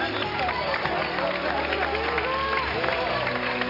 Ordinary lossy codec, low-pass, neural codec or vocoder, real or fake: none; 5.4 kHz; none; real